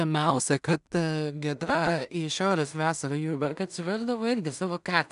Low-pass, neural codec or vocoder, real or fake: 10.8 kHz; codec, 16 kHz in and 24 kHz out, 0.4 kbps, LongCat-Audio-Codec, two codebook decoder; fake